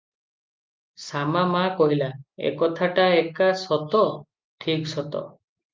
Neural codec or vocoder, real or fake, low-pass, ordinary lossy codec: none; real; 7.2 kHz; Opus, 24 kbps